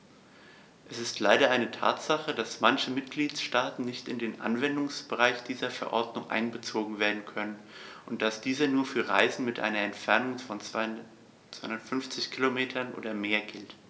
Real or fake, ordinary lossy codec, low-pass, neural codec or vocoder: real; none; none; none